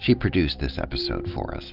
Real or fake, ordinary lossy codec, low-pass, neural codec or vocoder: real; Opus, 32 kbps; 5.4 kHz; none